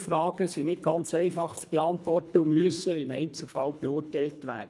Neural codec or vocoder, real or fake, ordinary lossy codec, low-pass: codec, 24 kHz, 1.5 kbps, HILCodec; fake; none; none